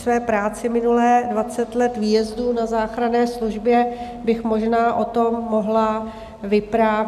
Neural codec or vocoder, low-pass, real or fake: none; 14.4 kHz; real